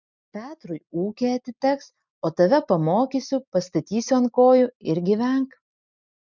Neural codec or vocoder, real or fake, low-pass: none; real; 7.2 kHz